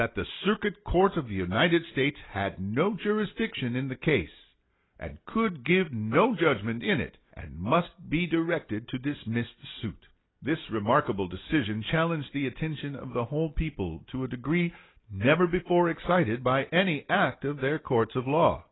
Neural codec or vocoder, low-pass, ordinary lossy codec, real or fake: none; 7.2 kHz; AAC, 16 kbps; real